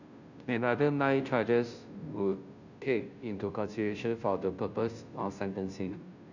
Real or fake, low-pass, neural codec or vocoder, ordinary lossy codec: fake; 7.2 kHz; codec, 16 kHz, 0.5 kbps, FunCodec, trained on Chinese and English, 25 frames a second; none